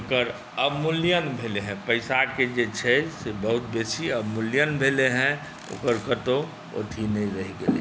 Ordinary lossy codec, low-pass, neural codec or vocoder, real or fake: none; none; none; real